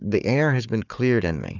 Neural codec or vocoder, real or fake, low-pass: codec, 16 kHz, 4 kbps, FunCodec, trained on Chinese and English, 50 frames a second; fake; 7.2 kHz